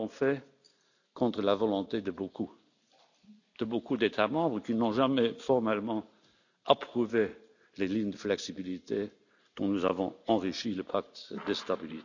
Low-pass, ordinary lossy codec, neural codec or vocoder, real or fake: 7.2 kHz; none; none; real